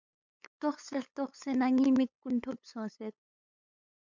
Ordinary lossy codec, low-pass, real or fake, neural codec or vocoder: MP3, 64 kbps; 7.2 kHz; fake; codec, 16 kHz, 8 kbps, FunCodec, trained on LibriTTS, 25 frames a second